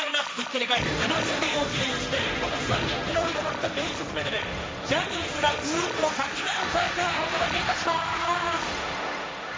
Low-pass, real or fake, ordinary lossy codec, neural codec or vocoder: none; fake; none; codec, 16 kHz, 1.1 kbps, Voila-Tokenizer